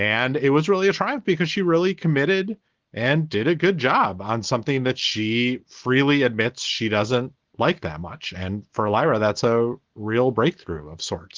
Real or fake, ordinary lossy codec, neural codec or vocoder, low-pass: real; Opus, 16 kbps; none; 7.2 kHz